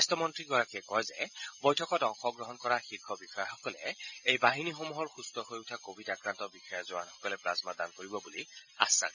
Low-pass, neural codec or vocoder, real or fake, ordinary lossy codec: 7.2 kHz; none; real; none